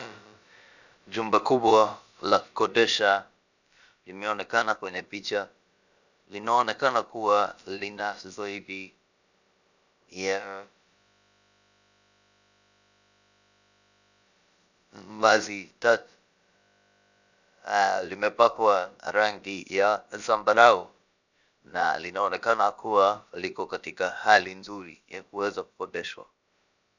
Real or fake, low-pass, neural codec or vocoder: fake; 7.2 kHz; codec, 16 kHz, about 1 kbps, DyCAST, with the encoder's durations